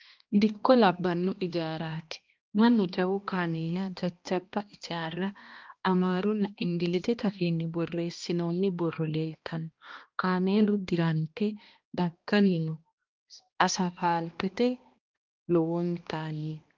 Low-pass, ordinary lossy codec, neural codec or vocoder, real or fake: 7.2 kHz; Opus, 24 kbps; codec, 16 kHz, 1 kbps, X-Codec, HuBERT features, trained on balanced general audio; fake